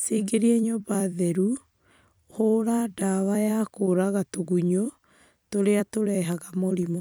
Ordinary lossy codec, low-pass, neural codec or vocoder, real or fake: none; none; vocoder, 44.1 kHz, 128 mel bands every 256 samples, BigVGAN v2; fake